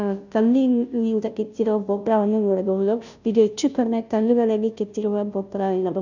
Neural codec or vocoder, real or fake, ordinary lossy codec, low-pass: codec, 16 kHz, 0.5 kbps, FunCodec, trained on Chinese and English, 25 frames a second; fake; none; 7.2 kHz